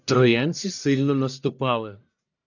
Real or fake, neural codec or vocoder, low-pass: fake; codec, 44.1 kHz, 1.7 kbps, Pupu-Codec; 7.2 kHz